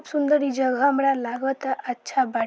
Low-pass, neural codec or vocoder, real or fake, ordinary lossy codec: none; none; real; none